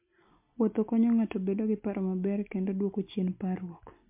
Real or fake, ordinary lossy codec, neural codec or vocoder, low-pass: real; MP3, 32 kbps; none; 3.6 kHz